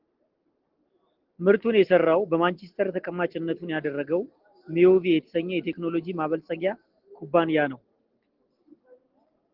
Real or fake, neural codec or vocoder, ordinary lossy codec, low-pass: real; none; Opus, 16 kbps; 5.4 kHz